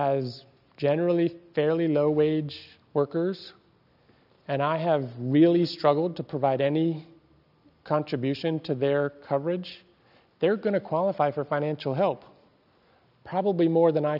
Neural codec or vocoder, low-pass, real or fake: none; 5.4 kHz; real